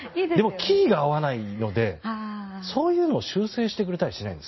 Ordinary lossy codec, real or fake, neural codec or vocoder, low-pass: MP3, 24 kbps; real; none; 7.2 kHz